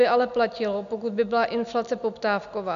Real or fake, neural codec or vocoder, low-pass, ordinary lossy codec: real; none; 7.2 kHz; AAC, 96 kbps